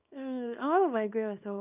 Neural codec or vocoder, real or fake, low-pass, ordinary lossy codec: codec, 24 kHz, 0.9 kbps, WavTokenizer, small release; fake; 3.6 kHz; none